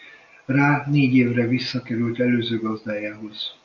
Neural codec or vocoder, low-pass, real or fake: none; 7.2 kHz; real